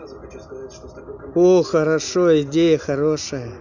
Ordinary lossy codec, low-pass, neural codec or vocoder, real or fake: none; 7.2 kHz; none; real